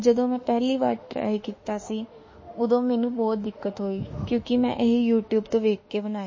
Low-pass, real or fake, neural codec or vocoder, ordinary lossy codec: 7.2 kHz; fake; autoencoder, 48 kHz, 32 numbers a frame, DAC-VAE, trained on Japanese speech; MP3, 32 kbps